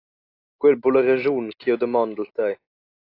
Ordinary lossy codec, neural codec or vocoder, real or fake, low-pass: AAC, 32 kbps; none; real; 5.4 kHz